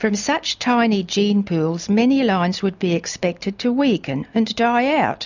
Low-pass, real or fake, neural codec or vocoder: 7.2 kHz; real; none